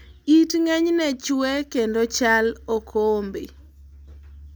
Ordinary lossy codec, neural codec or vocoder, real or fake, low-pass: none; none; real; none